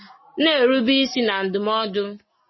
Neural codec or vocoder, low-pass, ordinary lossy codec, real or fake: none; 7.2 kHz; MP3, 24 kbps; real